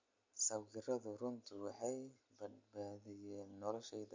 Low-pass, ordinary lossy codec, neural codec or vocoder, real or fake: 7.2 kHz; MP3, 48 kbps; none; real